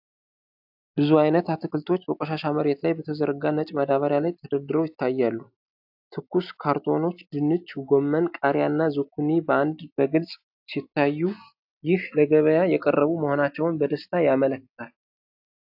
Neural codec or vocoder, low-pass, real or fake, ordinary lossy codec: none; 5.4 kHz; real; AAC, 48 kbps